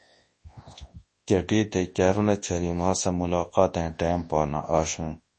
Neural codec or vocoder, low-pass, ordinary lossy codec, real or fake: codec, 24 kHz, 0.9 kbps, WavTokenizer, large speech release; 10.8 kHz; MP3, 32 kbps; fake